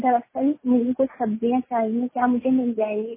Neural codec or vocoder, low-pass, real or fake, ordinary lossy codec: none; 3.6 kHz; real; MP3, 16 kbps